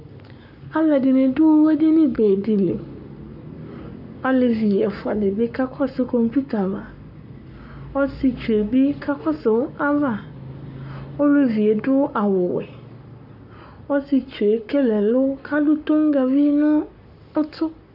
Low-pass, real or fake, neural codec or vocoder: 5.4 kHz; fake; codec, 44.1 kHz, 7.8 kbps, Pupu-Codec